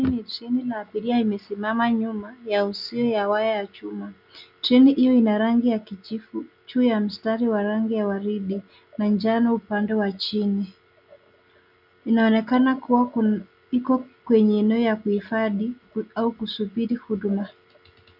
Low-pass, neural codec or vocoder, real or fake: 5.4 kHz; none; real